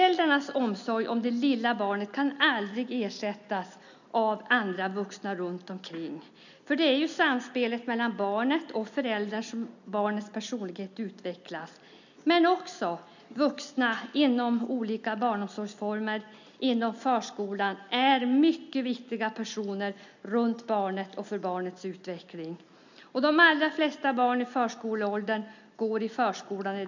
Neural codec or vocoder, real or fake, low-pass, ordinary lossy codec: none; real; 7.2 kHz; none